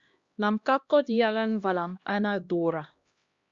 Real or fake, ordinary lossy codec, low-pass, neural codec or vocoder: fake; Opus, 64 kbps; 7.2 kHz; codec, 16 kHz, 1 kbps, X-Codec, HuBERT features, trained on LibriSpeech